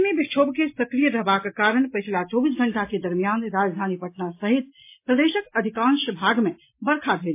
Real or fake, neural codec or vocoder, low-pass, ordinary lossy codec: real; none; 3.6 kHz; MP3, 24 kbps